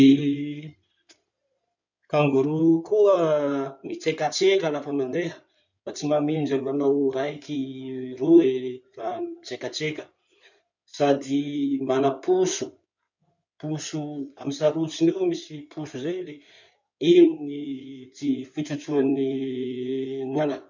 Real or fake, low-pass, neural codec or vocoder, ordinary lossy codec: fake; 7.2 kHz; codec, 16 kHz in and 24 kHz out, 2.2 kbps, FireRedTTS-2 codec; none